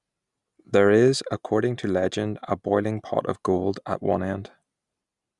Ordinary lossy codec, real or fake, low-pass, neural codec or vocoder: Opus, 64 kbps; real; 10.8 kHz; none